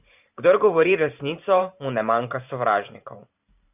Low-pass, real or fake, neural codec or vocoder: 3.6 kHz; fake; vocoder, 44.1 kHz, 128 mel bands every 512 samples, BigVGAN v2